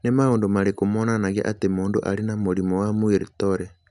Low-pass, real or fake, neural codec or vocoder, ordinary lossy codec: 10.8 kHz; real; none; none